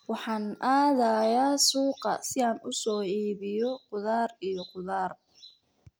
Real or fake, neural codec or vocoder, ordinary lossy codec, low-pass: real; none; none; none